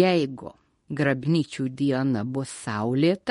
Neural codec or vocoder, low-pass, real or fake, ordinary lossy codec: codec, 24 kHz, 0.9 kbps, WavTokenizer, medium speech release version 2; 10.8 kHz; fake; MP3, 48 kbps